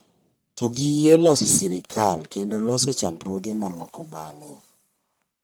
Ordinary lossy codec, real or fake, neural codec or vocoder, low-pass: none; fake; codec, 44.1 kHz, 1.7 kbps, Pupu-Codec; none